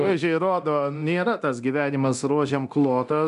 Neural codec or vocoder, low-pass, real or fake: codec, 24 kHz, 0.9 kbps, DualCodec; 10.8 kHz; fake